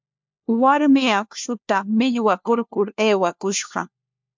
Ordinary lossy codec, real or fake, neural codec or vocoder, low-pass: MP3, 64 kbps; fake; codec, 16 kHz, 1 kbps, FunCodec, trained on LibriTTS, 50 frames a second; 7.2 kHz